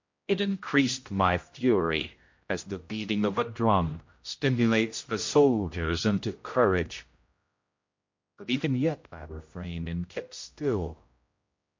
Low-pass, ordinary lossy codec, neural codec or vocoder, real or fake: 7.2 kHz; MP3, 48 kbps; codec, 16 kHz, 0.5 kbps, X-Codec, HuBERT features, trained on general audio; fake